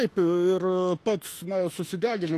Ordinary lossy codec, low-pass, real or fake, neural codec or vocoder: AAC, 48 kbps; 14.4 kHz; fake; autoencoder, 48 kHz, 32 numbers a frame, DAC-VAE, trained on Japanese speech